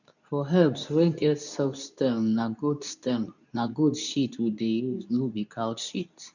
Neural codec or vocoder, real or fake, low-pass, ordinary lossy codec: codec, 24 kHz, 0.9 kbps, WavTokenizer, medium speech release version 2; fake; 7.2 kHz; none